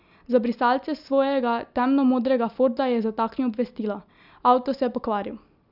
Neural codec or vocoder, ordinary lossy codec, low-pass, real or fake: none; none; 5.4 kHz; real